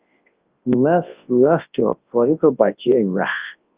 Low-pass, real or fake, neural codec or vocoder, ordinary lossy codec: 3.6 kHz; fake; codec, 24 kHz, 0.9 kbps, WavTokenizer, large speech release; Opus, 32 kbps